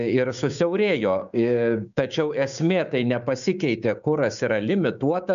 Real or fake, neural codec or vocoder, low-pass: fake; codec, 16 kHz, 6 kbps, DAC; 7.2 kHz